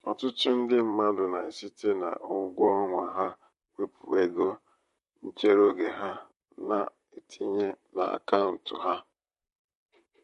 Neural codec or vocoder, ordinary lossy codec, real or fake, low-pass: vocoder, 44.1 kHz, 128 mel bands, Pupu-Vocoder; MP3, 48 kbps; fake; 14.4 kHz